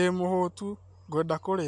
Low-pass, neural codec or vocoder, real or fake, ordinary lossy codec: 10.8 kHz; none; real; AAC, 64 kbps